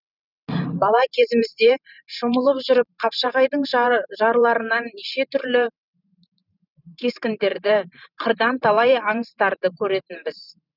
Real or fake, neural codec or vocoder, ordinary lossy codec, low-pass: fake; vocoder, 44.1 kHz, 128 mel bands every 512 samples, BigVGAN v2; none; 5.4 kHz